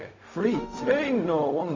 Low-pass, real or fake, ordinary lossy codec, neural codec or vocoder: 7.2 kHz; fake; MP3, 64 kbps; codec, 16 kHz, 0.4 kbps, LongCat-Audio-Codec